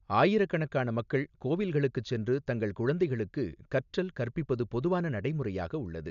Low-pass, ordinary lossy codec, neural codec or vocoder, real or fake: 7.2 kHz; none; none; real